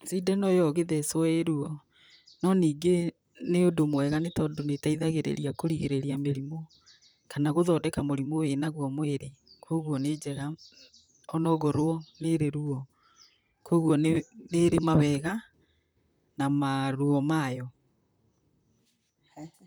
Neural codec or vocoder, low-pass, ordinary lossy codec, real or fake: vocoder, 44.1 kHz, 128 mel bands, Pupu-Vocoder; none; none; fake